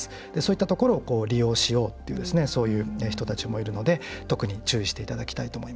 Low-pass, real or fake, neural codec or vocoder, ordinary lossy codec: none; real; none; none